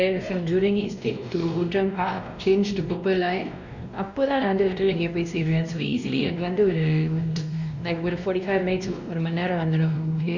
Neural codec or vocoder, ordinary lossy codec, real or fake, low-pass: codec, 16 kHz, 1 kbps, X-Codec, WavLM features, trained on Multilingual LibriSpeech; none; fake; 7.2 kHz